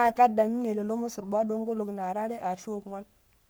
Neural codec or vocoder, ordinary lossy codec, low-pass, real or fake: codec, 44.1 kHz, 3.4 kbps, Pupu-Codec; none; none; fake